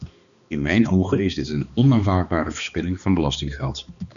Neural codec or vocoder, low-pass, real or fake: codec, 16 kHz, 2 kbps, X-Codec, HuBERT features, trained on balanced general audio; 7.2 kHz; fake